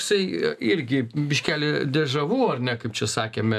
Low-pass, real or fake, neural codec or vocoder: 14.4 kHz; real; none